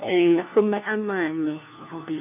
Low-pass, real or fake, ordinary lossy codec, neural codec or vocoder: 3.6 kHz; fake; none; codec, 16 kHz, 1 kbps, FunCodec, trained on LibriTTS, 50 frames a second